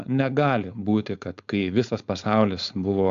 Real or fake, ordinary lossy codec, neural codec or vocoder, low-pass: fake; AAC, 64 kbps; codec, 16 kHz, 4.8 kbps, FACodec; 7.2 kHz